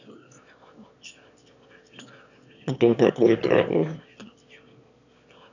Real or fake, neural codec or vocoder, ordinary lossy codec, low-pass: fake; autoencoder, 22.05 kHz, a latent of 192 numbers a frame, VITS, trained on one speaker; none; 7.2 kHz